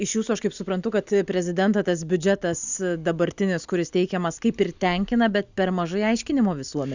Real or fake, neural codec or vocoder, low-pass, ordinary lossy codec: real; none; 7.2 kHz; Opus, 64 kbps